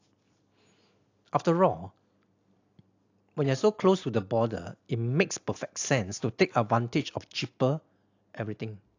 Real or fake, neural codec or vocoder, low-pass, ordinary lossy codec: real; none; 7.2 kHz; AAC, 48 kbps